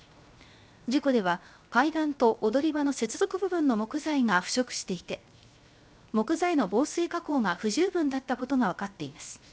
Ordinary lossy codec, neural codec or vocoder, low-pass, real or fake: none; codec, 16 kHz, 0.7 kbps, FocalCodec; none; fake